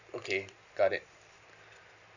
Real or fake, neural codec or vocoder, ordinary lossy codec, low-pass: real; none; none; 7.2 kHz